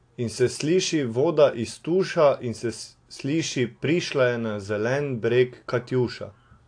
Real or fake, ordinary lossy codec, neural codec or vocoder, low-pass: real; AAC, 48 kbps; none; 9.9 kHz